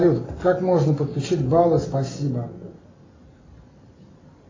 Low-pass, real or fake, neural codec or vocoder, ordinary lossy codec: 7.2 kHz; real; none; AAC, 32 kbps